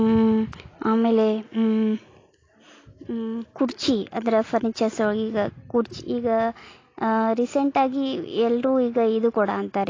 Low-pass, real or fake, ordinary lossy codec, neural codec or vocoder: 7.2 kHz; real; AAC, 32 kbps; none